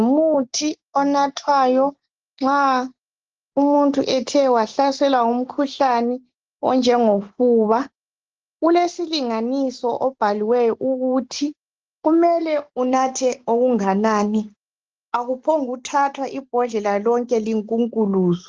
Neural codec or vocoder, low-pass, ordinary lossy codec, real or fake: none; 7.2 kHz; Opus, 16 kbps; real